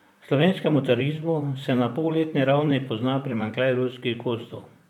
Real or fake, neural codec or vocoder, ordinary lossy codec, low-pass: fake; vocoder, 44.1 kHz, 128 mel bands, Pupu-Vocoder; MP3, 96 kbps; 19.8 kHz